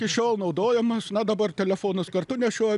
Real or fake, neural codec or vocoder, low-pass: real; none; 10.8 kHz